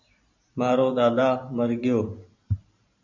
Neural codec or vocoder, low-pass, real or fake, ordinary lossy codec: vocoder, 44.1 kHz, 128 mel bands every 512 samples, BigVGAN v2; 7.2 kHz; fake; MP3, 64 kbps